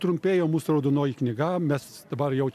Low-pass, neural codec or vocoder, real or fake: 14.4 kHz; none; real